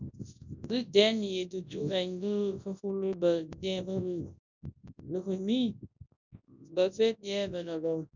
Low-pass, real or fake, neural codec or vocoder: 7.2 kHz; fake; codec, 24 kHz, 0.9 kbps, WavTokenizer, large speech release